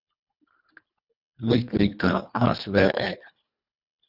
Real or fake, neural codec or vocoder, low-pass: fake; codec, 24 kHz, 1.5 kbps, HILCodec; 5.4 kHz